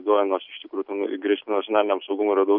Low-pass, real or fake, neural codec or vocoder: 5.4 kHz; real; none